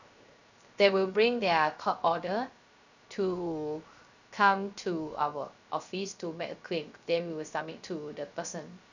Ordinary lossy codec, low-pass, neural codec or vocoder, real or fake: none; 7.2 kHz; codec, 16 kHz, 0.3 kbps, FocalCodec; fake